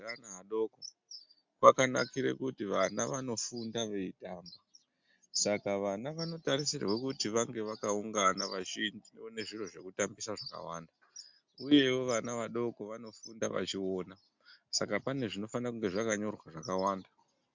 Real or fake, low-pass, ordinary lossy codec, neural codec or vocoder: real; 7.2 kHz; MP3, 64 kbps; none